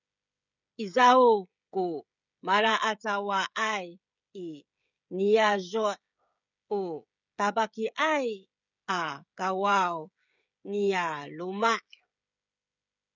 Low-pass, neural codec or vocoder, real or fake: 7.2 kHz; codec, 16 kHz, 16 kbps, FreqCodec, smaller model; fake